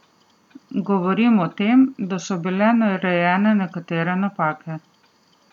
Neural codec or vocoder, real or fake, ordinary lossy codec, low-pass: none; real; none; 19.8 kHz